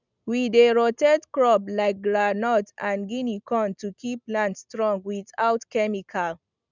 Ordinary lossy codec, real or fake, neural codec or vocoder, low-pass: none; real; none; 7.2 kHz